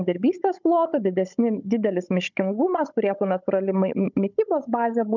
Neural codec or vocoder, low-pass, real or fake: codec, 16 kHz, 16 kbps, FunCodec, trained on Chinese and English, 50 frames a second; 7.2 kHz; fake